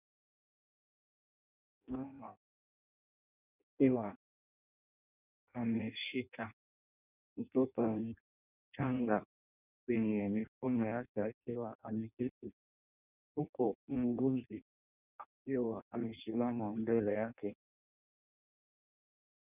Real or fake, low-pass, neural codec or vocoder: fake; 3.6 kHz; codec, 16 kHz in and 24 kHz out, 0.6 kbps, FireRedTTS-2 codec